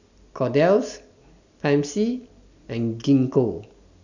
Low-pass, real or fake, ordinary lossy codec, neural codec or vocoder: 7.2 kHz; real; none; none